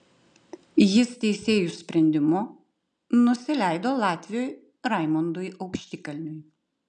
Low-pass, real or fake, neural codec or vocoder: 9.9 kHz; real; none